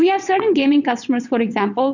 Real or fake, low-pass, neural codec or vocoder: fake; 7.2 kHz; vocoder, 22.05 kHz, 80 mel bands, WaveNeXt